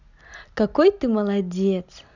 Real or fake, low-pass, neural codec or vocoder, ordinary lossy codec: real; 7.2 kHz; none; none